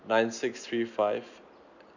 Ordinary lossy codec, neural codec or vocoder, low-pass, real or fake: none; none; 7.2 kHz; real